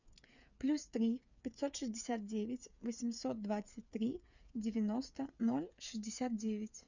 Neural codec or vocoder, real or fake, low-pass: codec, 16 kHz, 8 kbps, FreqCodec, smaller model; fake; 7.2 kHz